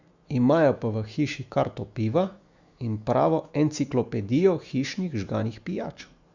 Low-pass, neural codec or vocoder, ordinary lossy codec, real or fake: 7.2 kHz; autoencoder, 48 kHz, 128 numbers a frame, DAC-VAE, trained on Japanese speech; Opus, 64 kbps; fake